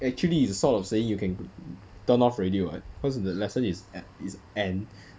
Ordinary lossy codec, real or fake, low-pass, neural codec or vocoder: none; real; none; none